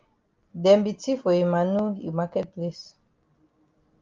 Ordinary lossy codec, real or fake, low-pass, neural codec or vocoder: Opus, 24 kbps; real; 7.2 kHz; none